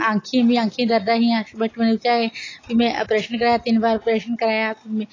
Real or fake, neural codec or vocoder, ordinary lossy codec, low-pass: real; none; AAC, 32 kbps; 7.2 kHz